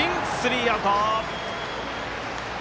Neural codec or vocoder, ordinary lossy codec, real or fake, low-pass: none; none; real; none